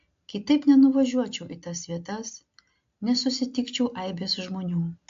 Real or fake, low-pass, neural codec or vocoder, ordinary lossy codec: real; 7.2 kHz; none; AAC, 48 kbps